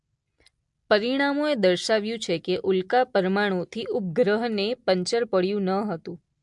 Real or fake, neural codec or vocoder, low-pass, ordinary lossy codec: real; none; 10.8 kHz; MP3, 64 kbps